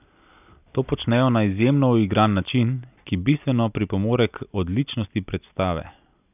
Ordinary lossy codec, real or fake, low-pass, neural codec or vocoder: none; real; 3.6 kHz; none